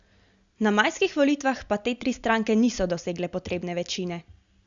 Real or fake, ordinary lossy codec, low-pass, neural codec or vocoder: real; Opus, 64 kbps; 7.2 kHz; none